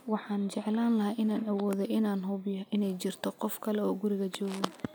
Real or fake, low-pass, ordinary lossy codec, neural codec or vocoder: real; none; none; none